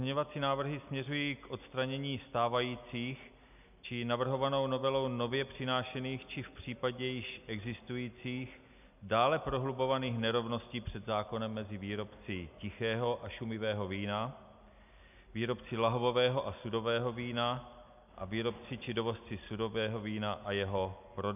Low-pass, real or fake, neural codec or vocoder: 3.6 kHz; real; none